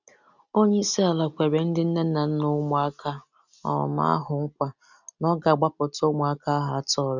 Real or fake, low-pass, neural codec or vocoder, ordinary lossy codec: real; 7.2 kHz; none; none